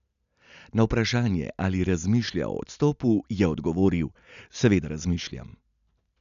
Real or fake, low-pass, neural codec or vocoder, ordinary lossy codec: real; 7.2 kHz; none; none